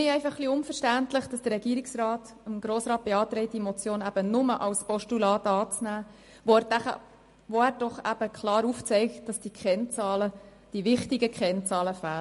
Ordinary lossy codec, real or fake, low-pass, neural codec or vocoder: MP3, 48 kbps; real; 14.4 kHz; none